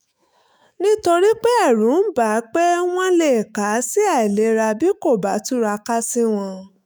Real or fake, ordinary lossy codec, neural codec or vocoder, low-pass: fake; none; autoencoder, 48 kHz, 128 numbers a frame, DAC-VAE, trained on Japanese speech; none